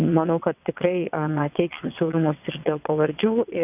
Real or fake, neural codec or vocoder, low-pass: fake; vocoder, 22.05 kHz, 80 mel bands, WaveNeXt; 3.6 kHz